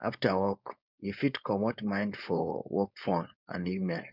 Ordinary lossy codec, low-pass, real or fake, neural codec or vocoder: none; 5.4 kHz; fake; codec, 16 kHz, 4.8 kbps, FACodec